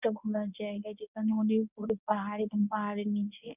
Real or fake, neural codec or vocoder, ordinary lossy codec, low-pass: fake; codec, 24 kHz, 0.9 kbps, WavTokenizer, medium speech release version 1; none; 3.6 kHz